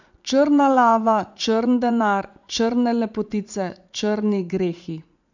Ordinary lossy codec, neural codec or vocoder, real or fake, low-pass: none; vocoder, 44.1 kHz, 80 mel bands, Vocos; fake; 7.2 kHz